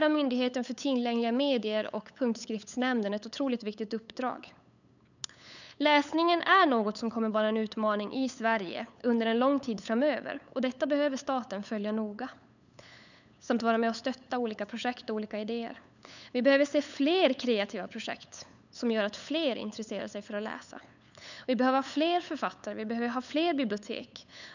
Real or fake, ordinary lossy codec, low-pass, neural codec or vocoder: fake; none; 7.2 kHz; codec, 16 kHz, 8 kbps, FunCodec, trained on LibriTTS, 25 frames a second